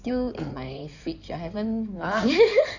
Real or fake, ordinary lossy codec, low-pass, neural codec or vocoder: fake; none; 7.2 kHz; codec, 16 kHz in and 24 kHz out, 2.2 kbps, FireRedTTS-2 codec